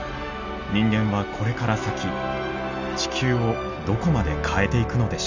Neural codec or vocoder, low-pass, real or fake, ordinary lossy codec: none; 7.2 kHz; real; Opus, 64 kbps